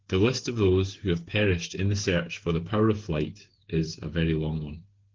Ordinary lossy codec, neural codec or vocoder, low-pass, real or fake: Opus, 16 kbps; codec, 16 kHz, 8 kbps, FreqCodec, smaller model; 7.2 kHz; fake